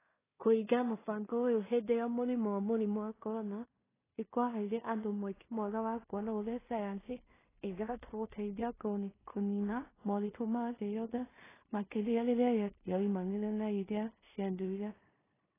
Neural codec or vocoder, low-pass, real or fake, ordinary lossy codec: codec, 16 kHz in and 24 kHz out, 0.4 kbps, LongCat-Audio-Codec, two codebook decoder; 3.6 kHz; fake; AAC, 16 kbps